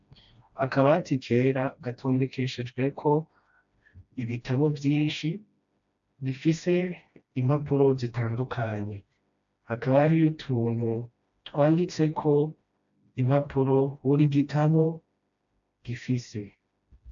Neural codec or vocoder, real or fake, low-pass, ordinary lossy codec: codec, 16 kHz, 1 kbps, FreqCodec, smaller model; fake; 7.2 kHz; AAC, 64 kbps